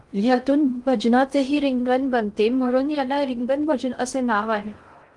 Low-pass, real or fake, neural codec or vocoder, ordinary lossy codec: 10.8 kHz; fake; codec, 16 kHz in and 24 kHz out, 0.6 kbps, FocalCodec, streaming, 2048 codes; Opus, 24 kbps